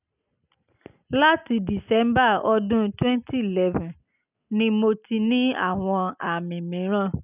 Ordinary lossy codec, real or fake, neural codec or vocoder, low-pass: none; real; none; 3.6 kHz